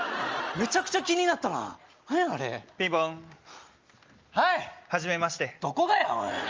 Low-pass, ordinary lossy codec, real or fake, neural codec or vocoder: 7.2 kHz; Opus, 24 kbps; real; none